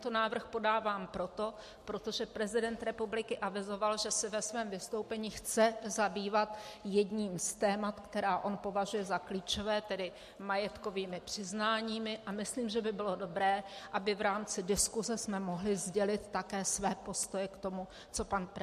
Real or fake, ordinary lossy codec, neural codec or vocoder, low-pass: real; MP3, 64 kbps; none; 14.4 kHz